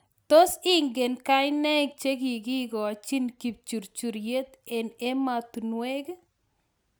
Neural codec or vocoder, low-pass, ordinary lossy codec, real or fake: none; none; none; real